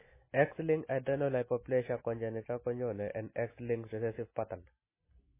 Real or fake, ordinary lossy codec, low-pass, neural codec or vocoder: real; MP3, 16 kbps; 3.6 kHz; none